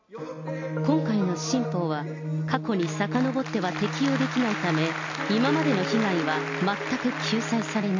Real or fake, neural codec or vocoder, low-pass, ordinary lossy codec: real; none; 7.2 kHz; MP3, 64 kbps